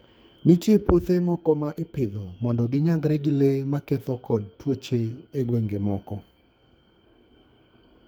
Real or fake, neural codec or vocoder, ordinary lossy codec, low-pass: fake; codec, 44.1 kHz, 2.6 kbps, SNAC; none; none